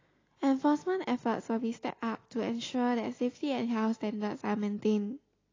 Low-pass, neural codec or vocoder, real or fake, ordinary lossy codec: 7.2 kHz; none; real; AAC, 32 kbps